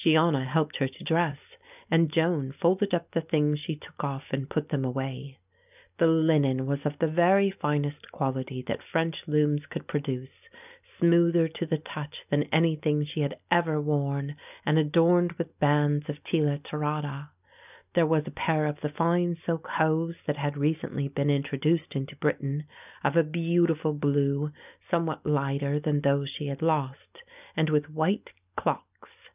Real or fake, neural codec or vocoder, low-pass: real; none; 3.6 kHz